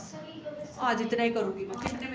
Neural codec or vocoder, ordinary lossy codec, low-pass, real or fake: none; none; none; real